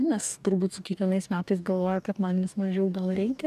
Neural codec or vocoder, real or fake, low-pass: codec, 44.1 kHz, 2.6 kbps, DAC; fake; 14.4 kHz